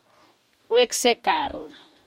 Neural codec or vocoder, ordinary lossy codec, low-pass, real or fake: codec, 44.1 kHz, 2.6 kbps, DAC; MP3, 64 kbps; 19.8 kHz; fake